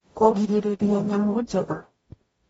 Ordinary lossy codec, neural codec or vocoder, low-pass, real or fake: AAC, 24 kbps; codec, 44.1 kHz, 0.9 kbps, DAC; 19.8 kHz; fake